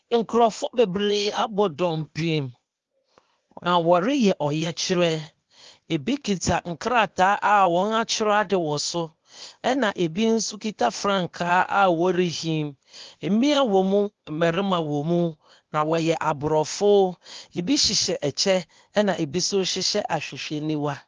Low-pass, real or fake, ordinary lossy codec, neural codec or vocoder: 7.2 kHz; fake; Opus, 32 kbps; codec, 16 kHz, 0.8 kbps, ZipCodec